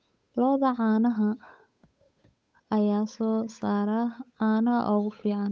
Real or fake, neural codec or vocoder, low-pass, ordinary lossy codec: fake; codec, 16 kHz, 8 kbps, FunCodec, trained on Chinese and English, 25 frames a second; none; none